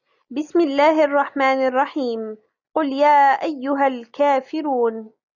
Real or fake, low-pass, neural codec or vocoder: real; 7.2 kHz; none